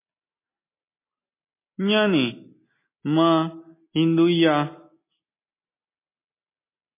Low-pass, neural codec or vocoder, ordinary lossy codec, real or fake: 3.6 kHz; none; MP3, 24 kbps; real